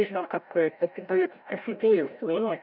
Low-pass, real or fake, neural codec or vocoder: 5.4 kHz; fake; codec, 16 kHz, 0.5 kbps, FreqCodec, larger model